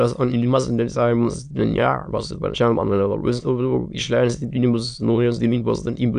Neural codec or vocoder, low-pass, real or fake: autoencoder, 22.05 kHz, a latent of 192 numbers a frame, VITS, trained on many speakers; 9.9 kHz; fake